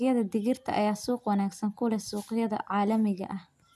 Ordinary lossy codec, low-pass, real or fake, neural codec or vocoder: none; 14.4 kHz; real; none